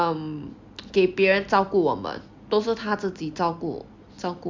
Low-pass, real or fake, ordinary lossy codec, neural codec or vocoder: 7.2 kHz; real; MP3, 64 kbps; none